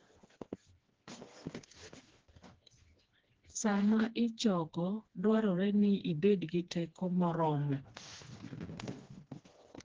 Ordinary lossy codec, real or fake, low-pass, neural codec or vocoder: Opus, 16 kbps; fake; 7.2 kHz; codec, 16 kHz, 2 kbps, FreqCodec, smaller model